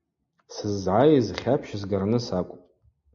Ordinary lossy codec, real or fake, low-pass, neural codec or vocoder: MP3, 48 kbps; real; 7.2 kHz; none